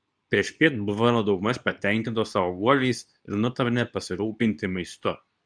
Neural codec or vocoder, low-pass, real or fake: codec, 24 kHz, 0.9 kbps, WavTokenizer, medium speech release version 2; 9.9 kHz; fake